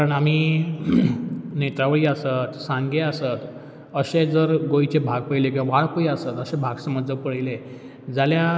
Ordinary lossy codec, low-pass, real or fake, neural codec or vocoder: none; none; real; none